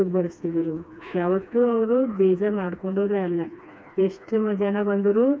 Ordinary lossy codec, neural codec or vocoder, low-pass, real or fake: none; codec, 16 kHz, 2 kbps, FreqCodec, smaller model; none; fake